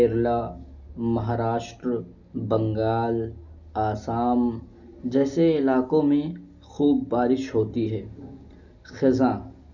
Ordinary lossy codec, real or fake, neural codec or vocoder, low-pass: none; real; none; 7.2 kHz